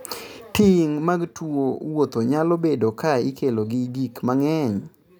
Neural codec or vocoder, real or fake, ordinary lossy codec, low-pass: none; real; none; none